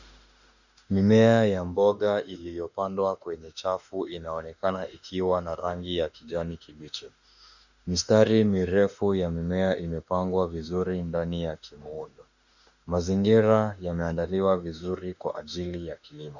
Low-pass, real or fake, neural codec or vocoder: 7.2 kHz; fake; autoencoder, 48 kHz, 32 numbers a frame, DAC-VAE, trained on Japanese speech